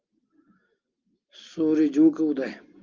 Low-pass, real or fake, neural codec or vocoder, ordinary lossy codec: 7.2 kHz; real; none; Opus, 32 kbps